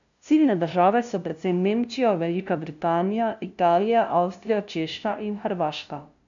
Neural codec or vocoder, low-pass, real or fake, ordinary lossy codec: codec, 16 kHz, 0.5 kbps, FunCodec, trained on LibriTTS, 25 frames a second; 7.2 kHz; fake; none